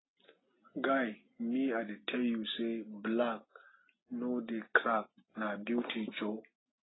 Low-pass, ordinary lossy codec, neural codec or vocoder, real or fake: 7.2 kHz; AAC, 16 kbps; none; real